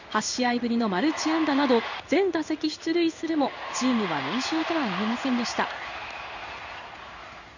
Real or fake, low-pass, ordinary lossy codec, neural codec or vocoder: fake; 7.2 kHz; none; codec, 16 kHz in and 24 kHz out, 1 kbps, XY-Tokenizer